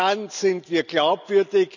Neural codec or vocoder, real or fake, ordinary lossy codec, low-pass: none; real; none; 7.2 kHz